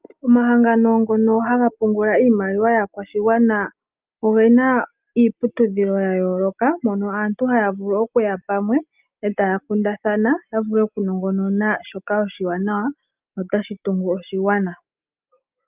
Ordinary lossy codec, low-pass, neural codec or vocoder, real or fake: Opus, 24 kbps; 3.6 kHz; none; real